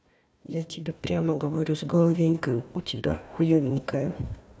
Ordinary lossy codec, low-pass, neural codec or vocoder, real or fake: none; none; codec, 16 kHz, 1 kbps, FunCodec, trained on Chinese and English, 50 frames a second; fake